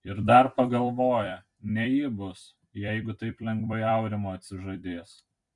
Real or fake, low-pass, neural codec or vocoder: fake; 10.8 kHz; vocoder, 44.1 kHz, 128 mel bands every 256 samples, BigVGAN v2